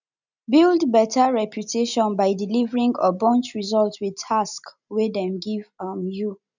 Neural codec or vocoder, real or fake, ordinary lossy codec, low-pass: none; real; none; 7.2 kHz